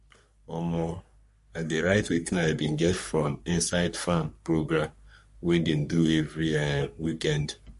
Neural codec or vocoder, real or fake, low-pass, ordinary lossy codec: codec, 44.1 kHz, 3.4 kbps, Pupu-Codec; fake; 14.4 kHz; MP3, 48 kbps